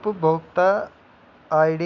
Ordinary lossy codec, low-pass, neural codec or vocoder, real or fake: none; 7.2 kHz; none; real